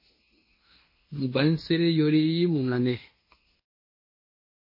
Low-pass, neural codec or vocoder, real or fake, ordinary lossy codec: 5.4 kHz; codec, 16 kHz in and 24 kHz out, 0.9 kbps, LongCat-Audio-Codec, fine tuned four codebook decoder; fake; MP3, 24 kbps